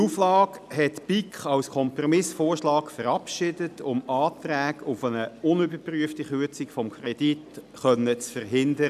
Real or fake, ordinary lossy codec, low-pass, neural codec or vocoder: real; none; 14.4 kHz; none